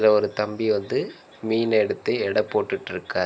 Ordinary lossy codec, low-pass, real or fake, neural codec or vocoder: none; none; real; none